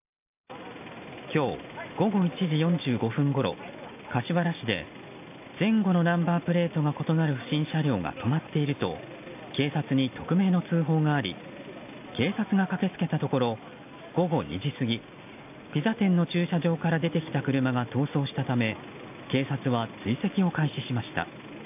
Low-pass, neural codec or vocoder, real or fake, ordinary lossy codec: 3.6 kHz; none; real; AAC, 32 kbps